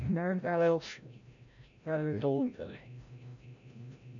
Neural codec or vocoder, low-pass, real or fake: codec, 16 kHz, 0.5 kbps, FreqCodec, larger model; 7.2 kHz; fake